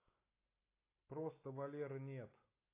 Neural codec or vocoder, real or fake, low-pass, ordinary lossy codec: none; real; 3.6 kHz; AAC, 24 kbps